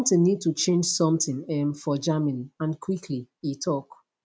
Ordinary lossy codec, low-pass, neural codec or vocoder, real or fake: none; none; none; real